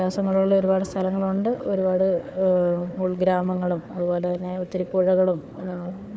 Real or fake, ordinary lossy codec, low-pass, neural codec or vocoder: fake; none; none; codec, 16 kHz, 4 kbps, FunCodec, trained on Chinese and English, 50 frames a second